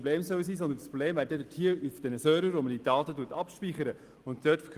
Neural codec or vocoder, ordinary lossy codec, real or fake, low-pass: none; Opus, 24 kbps; real; 14.4 kHz